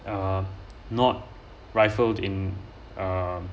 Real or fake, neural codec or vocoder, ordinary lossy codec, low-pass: real; none; none; none